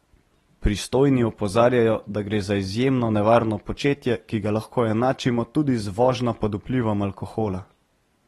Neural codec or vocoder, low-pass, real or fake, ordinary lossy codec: none; 19.8 kHz; real; AAC, 32 kbps